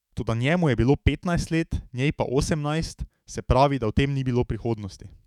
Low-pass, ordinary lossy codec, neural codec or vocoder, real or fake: 19.8 kHz; none; autoencoder, 48 kHz, 128 numbers a frame, DAC-VAE, trained on Japanese speech; fake